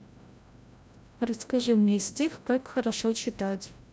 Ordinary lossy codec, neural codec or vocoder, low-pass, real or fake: none; codec, 16 kHz, 0.5 kbps, FreqCodec, larger model; none; fake